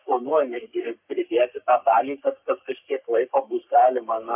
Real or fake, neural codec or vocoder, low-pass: fake; codec, 44.1 kHz, 3.4 kbps, Pupu-Codec; 3.6 kHz